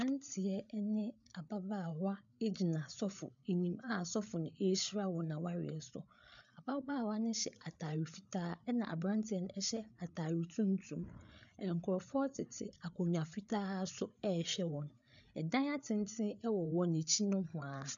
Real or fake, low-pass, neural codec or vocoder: fake; 7.2 kHz; codec, 16 kHz, 8 kbps, FreqCodec, larger model